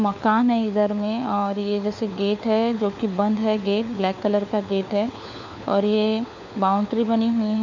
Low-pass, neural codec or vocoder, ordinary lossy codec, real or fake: 7.2 kHz; codec, 16 kHz, 4 kbps, FunCodec, trained on LibriTTS, 50 frames a second; none; fake